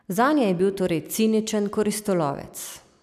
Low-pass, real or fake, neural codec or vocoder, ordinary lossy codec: 14.4 kHz; real; none; none